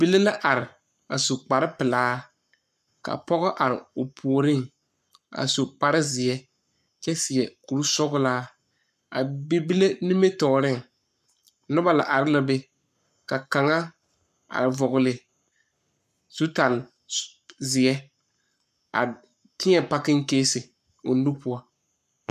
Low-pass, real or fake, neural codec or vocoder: 14.4 kHz; fake; codec, 44.1 kHz, 7.8 kbps, Pupu-Codec